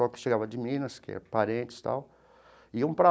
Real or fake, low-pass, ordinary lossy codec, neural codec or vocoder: real; none; none; none